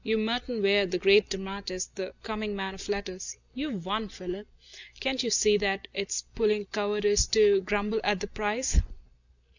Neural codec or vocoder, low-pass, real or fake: none; 7.2 kHz; real